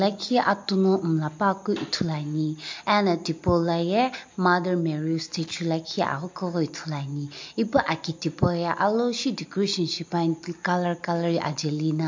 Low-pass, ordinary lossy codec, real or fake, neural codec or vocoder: 7.2 kHz; MP3, 48 kbps; real; none